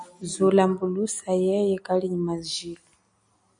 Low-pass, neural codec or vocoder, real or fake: 9.9 kHz; none; real